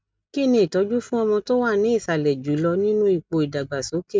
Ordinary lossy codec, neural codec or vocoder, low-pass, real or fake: none; none; none; real